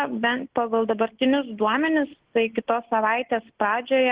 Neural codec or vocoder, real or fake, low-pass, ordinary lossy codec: none; real; 3.6 kHz; Opus, 16 kbps